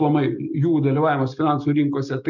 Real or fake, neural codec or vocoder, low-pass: real; none; 7.2 kHz